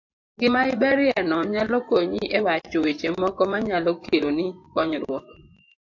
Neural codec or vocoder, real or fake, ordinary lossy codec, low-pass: vocoder, 24 kHz, 100 mel bands, Vocos; fake; AAC, 48 kbps; 7.2 kHz